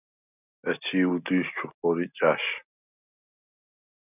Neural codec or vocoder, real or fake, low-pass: none; real; 3.6 kHz